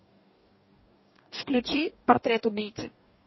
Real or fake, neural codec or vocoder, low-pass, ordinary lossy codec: fake; codec, 44.1 kHz, 2.6 kbps, DAC; 7.2 kHz; MP3, 24 kbps